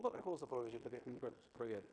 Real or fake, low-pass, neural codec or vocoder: fake; 9.9 kHz; codec, 16 kHz in and 24 kHz out, 0.9 kbps, LongCat-Audio-Codec, fine tuned four codebook decoder